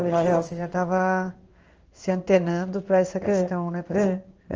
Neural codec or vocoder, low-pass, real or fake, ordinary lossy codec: codec, 16 kHz in and 24 kHz out, 1 kbps, XY-Tokenizer; 7.2 kHz; fake; Opus, 24 kbps